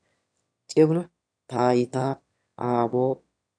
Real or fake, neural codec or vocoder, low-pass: fake; autoencoder, 22.05 kHz, a latent of 192 numbers a frame, VITS, trained on one speaker; 9.9 kHz